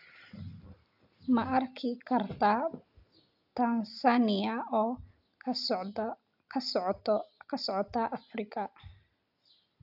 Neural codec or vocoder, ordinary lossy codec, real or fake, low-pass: none; AAC, 48 kbps; real; 5.4 kHz